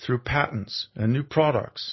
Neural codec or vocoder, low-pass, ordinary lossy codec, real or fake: none; 7.2 kHz; MP3, 24 kbps; real